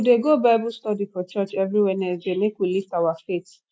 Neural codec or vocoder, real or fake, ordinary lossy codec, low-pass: none; real; none; none